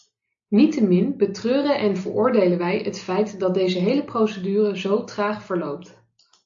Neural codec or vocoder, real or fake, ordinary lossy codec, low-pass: none; real; AAC, 64 kbps; 7.2 kHz